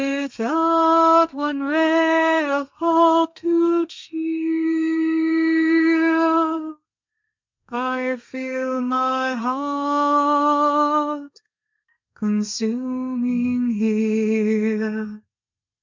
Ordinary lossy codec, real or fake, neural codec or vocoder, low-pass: MP3, 64 kbps; fake; codec, 32 kHz, 1.9 kbps, SNAC; 7.2 kHz